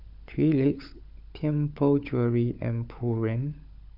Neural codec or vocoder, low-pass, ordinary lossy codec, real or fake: codec, 16 kHz, 16 kbps, FunCodec, trained on LibriTTS, 50 frames a second; 5.4 kHz; none; fake